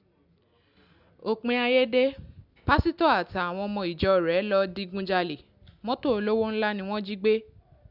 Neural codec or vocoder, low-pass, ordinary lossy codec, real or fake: none; 5.4 kHz; none; real